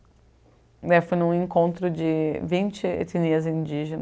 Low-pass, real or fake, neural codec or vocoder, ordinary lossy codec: none; real; none; none